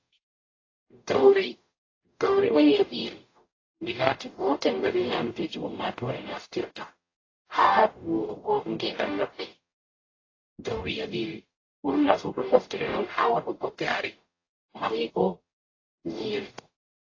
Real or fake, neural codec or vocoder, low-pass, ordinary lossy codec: fake; codec, 44.1 kHz, 0.9 kbps, DAC; 7.2 kHz; AAC, 32 kbps